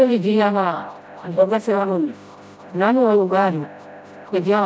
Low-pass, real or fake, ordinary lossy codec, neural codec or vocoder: none; fake; none; codec, 16 kHz, 0.5 kbps, FreqCodec, smaller model